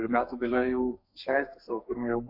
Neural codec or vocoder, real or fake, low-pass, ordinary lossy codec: codec, 32 kHz, 1.9 kbps, SNAC; fake; 5.4 kHz; AAC, 48 kbps